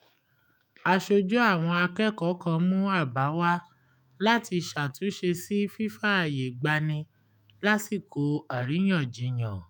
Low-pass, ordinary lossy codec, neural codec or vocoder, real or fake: none; none; autoencoder, 48 kHz, 128 numbers a frame, DAC-VAE, trained on Japanese speech; fake